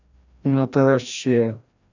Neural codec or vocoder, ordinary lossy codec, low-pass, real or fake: codec, 16 kHz, 1 kbps, FreqCodec, larger model; none; 7.2 kHz; fake